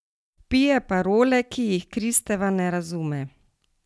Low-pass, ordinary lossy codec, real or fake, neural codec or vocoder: none; none; real; none